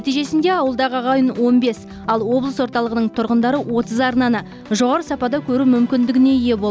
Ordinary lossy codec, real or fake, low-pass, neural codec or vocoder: none; real; none; none